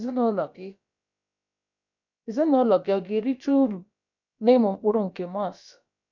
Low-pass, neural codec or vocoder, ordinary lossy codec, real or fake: 7.2 kHz; codec, 16 kHz, about 1 kbps, DyCAST, with the encoder's durations; none; fake